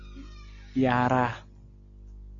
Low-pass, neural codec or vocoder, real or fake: 7.2 kHz; none; real